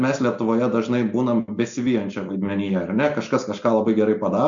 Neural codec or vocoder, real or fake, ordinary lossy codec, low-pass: none; real; MP3, 64 kbps; 7.2 kHz